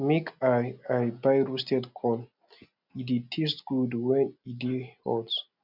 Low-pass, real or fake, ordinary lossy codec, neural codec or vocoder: 5.4 kHz; real; none; none